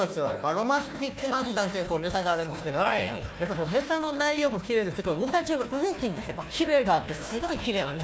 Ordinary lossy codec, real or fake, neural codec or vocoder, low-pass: none; fake; codec, 16 kHz, 1 kbps, FunCodec, trained on Chinese and English, 50 frames a second; none